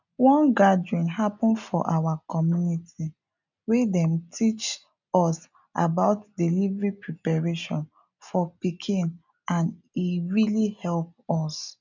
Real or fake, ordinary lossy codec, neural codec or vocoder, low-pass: real; none; none; 7.2 kHz